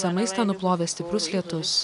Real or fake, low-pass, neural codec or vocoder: real; 10.8 kHz; none